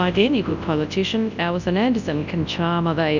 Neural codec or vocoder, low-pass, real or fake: codec, 24 kHz, 0.9 kbps, WavTokenizer, large speech release; 7.2 kHz; fake